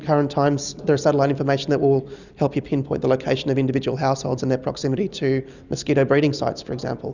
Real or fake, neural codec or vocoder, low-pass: real; none; 7.2 kHz